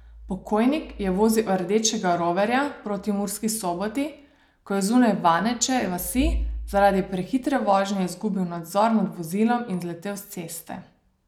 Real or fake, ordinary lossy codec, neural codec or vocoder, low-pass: real; none; none; 19.8 kHz